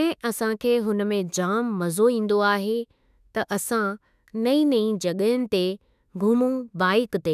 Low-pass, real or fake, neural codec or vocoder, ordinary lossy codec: 14.4 kHz; fake; autoencoder, 48 kHz, 32 numbers a frame, DAC-VAE, trained on Japanese speech; none